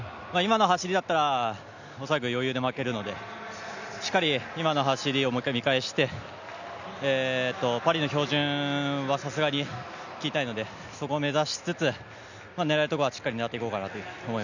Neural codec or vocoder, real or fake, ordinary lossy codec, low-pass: none; real; none; 7.2 kHz